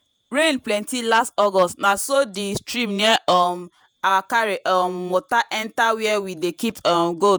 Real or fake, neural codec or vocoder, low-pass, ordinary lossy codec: fake; vocoder, 48 kHz, 128 mel bands, Vocos; none; none